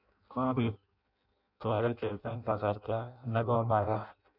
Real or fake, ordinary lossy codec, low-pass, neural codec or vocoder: fake; none; 5.4 kHz; codec, 16 kHz in and 24 kHz out, 0.6 kbps, FireRedTTS-2 codec